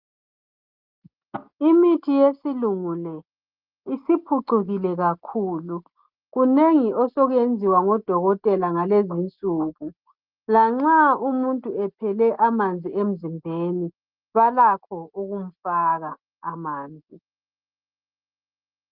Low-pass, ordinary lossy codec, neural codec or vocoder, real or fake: 5.4 kHz; Opus, 32 kbps; none; real